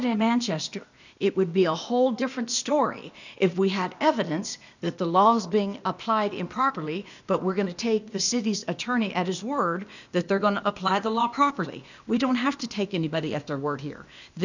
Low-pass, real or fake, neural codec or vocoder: 7.2 kHz; fake; codec, 16 kHz, 0.8 kbps, ZipCodec